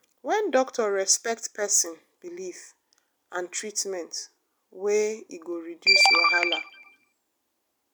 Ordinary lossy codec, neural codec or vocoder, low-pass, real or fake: none; none; none; real